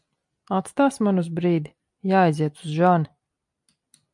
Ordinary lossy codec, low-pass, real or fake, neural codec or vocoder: MP3, 64 kbps; 10.8 kHz; real; none